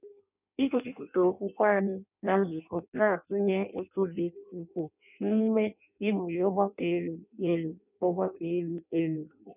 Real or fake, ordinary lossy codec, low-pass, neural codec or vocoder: fake; none; 3.6 kHz; codec, 16 kHz in and 24 kHz out, 0.6 kbps, FireRedTTS-2 codec